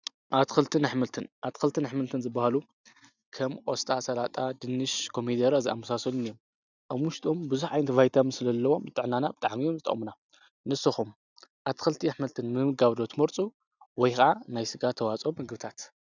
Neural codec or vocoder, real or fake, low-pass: none; real; 7.2 kHz